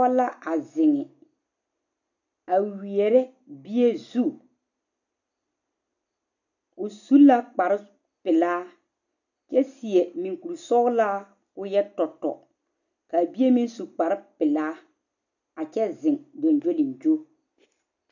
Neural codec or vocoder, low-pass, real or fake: none; 7.2 kHz; real